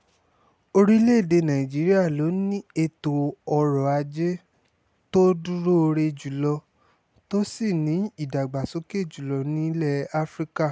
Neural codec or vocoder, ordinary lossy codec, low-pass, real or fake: none; none; none; real